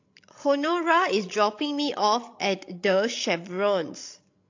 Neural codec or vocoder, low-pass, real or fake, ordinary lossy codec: codec, 16 kHz, 16 kbps, FreqCodec, larger model; 7.2 kHz; fake; AAC, 48 kbps